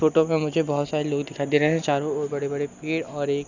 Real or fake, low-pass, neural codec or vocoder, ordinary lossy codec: real; 7.2 kHz; none; none